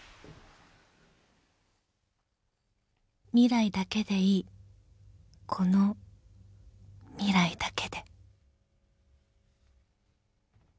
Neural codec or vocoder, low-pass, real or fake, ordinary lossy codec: none; none; real; none